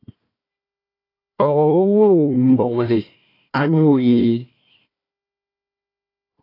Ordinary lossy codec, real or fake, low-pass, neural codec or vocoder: AAC, 32 kbps; fake; 5.4 kHz; codec, 16 kHz, 1 kbps, FunCodec, trained on Chinese and English, 50 frames a second